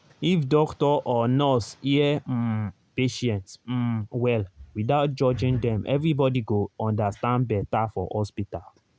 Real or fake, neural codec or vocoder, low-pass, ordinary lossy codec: real; none; none; none